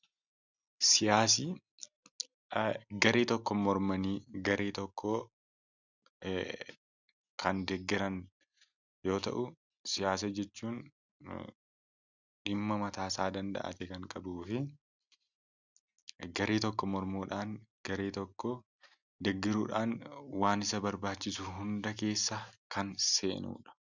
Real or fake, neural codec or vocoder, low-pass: real; none; 7.2 kHz